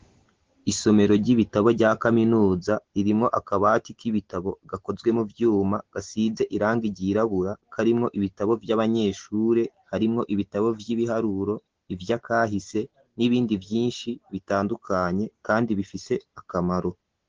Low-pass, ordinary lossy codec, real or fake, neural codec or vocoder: 7.2 kHz; Opus, 16 kbps; real; none